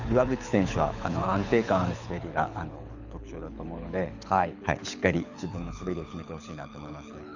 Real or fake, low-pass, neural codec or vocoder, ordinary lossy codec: fake; 7.2 kHz; codec, 24 kHz, 6 kbps, HILCodec; none